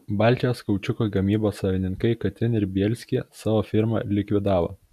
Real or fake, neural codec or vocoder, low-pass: real; none; 14.4 kHz